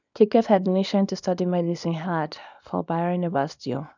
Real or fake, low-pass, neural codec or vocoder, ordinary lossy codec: fake; 7.2 kHz; codec, 24 kHz, 0.9 kbps, WavTokenizer, small release; none